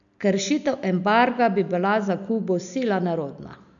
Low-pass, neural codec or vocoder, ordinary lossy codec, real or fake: 7.2 kHz; none; none; real